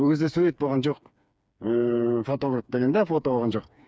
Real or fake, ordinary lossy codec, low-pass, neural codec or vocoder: fake; none; none; codec, 16 kHz, 4 kbps, FreqCodec, smaller model